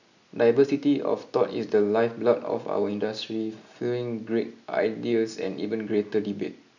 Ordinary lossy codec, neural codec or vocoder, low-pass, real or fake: none; none; 7.2 kHz; real